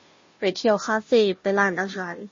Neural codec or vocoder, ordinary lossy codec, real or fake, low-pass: codec, 16 kHz, 0.5 kbps, FunCodec, trained on Chinese and English, 25 frames a second; MP3, 32 kbps; fake; 7.2 kHz